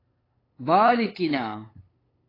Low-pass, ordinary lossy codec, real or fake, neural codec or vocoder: 5.4 kHz; AAC, 24 kbps; fake; codec, 16 kHz, 8 kbps, FunCodec, trained on LibriTTS, 25 frames a second